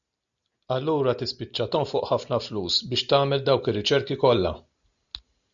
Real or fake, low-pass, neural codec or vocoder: real; 7.2 kHz; none